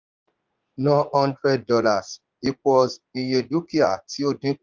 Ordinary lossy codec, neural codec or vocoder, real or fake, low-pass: Opus, 32 kbps; vocoder, 44.1 kHz, 80 mel bands, Vocos; fake; 7.2 kHz